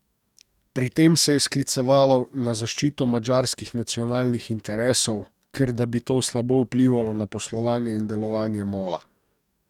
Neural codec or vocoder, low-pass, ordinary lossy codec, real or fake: codec, 44.1 kHz, 2.6 kbps, DAC; 19.8 kHz; none; fake